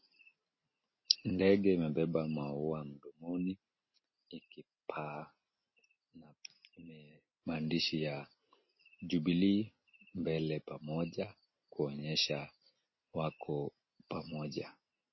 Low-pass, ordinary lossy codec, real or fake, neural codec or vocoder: 7.2 kHz; MP3, 24 kbps; real; none